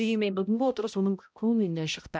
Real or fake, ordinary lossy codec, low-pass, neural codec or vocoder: fake; none; none; codec, 16 kHz, 0.5 kbps, X-Codec, HuBERT features, trained on balanced general audio